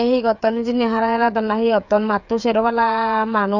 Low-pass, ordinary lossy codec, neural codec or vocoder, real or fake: 7.2 kHz; none; codec, 16 kHz, 8 kbps, FreqCodec, smaller model; fake